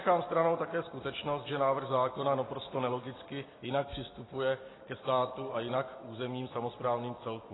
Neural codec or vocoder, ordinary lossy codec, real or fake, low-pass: none; AAC, 16 kbps; real; 7.2 kHz